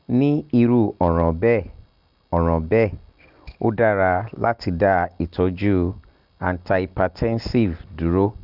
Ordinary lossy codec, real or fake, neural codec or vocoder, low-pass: Opus, 32 kbps; real; none; 5.4 kHz